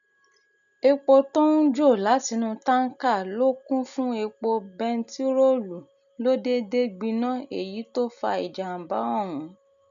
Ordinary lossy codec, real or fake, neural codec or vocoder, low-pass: none; real; none; 7.2 kHz